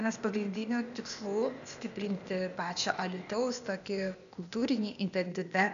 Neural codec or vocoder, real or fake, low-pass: codec, 16 kHz, 0.8 kbps, ZipCodec; fake; 7.2 kHz